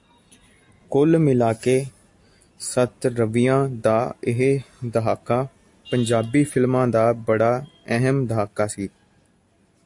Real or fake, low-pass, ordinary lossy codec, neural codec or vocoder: real; 10.8 kHz; AAC, 64 kbps; none